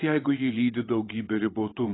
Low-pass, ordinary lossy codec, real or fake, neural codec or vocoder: 7.2 kHz; AAC, 16 kbps; fake; vocoder, 22.05 kHz, 80 mel bands, WaveNeXt